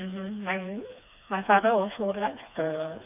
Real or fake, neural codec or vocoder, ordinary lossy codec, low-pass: fake; codec, 16 kHz, 2 kbps, FreqCodec, smaller model; none; 3.6 kHz